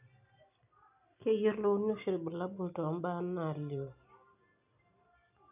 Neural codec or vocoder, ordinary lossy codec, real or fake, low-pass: none; none; real; 3.6 kHz